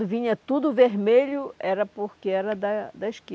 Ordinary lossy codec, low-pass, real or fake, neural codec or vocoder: none; none; real; none